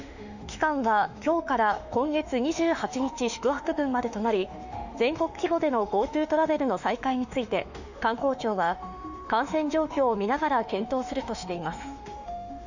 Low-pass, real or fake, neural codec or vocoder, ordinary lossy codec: 7.2 kHz; fake; autoencoder, 48 kHz, 32 numbers a frame, DAC-VAE, trained on Japanese speech; none